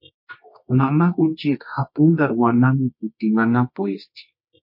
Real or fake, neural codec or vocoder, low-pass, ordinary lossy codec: fake; codec, 24 kHz, 0.9 kbps, WavTokenizer, medium music audio release; 5.4 kHz; MP3, 32 kbps